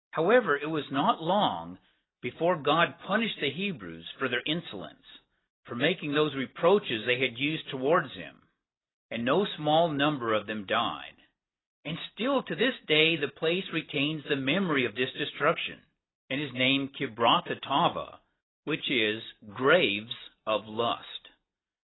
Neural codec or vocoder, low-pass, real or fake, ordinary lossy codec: none; 7.2 kHz; real; AAC, 16 kbps